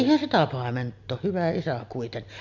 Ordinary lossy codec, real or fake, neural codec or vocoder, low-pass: Opus, 64 kbps; real; none; 7.2 kHz